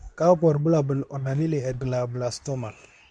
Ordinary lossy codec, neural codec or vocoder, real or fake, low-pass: none; codec, 24 kHz, 0.9 kbps, WavTokenizer, medium speech release version 2; fake; 9.9 kHz